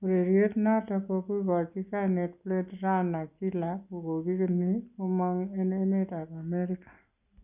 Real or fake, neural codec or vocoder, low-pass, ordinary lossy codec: real; none; 3.6 kHz; none